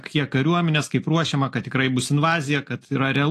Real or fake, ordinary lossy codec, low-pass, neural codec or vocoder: real; AAC, 48 kbps; 14.4 kHz; none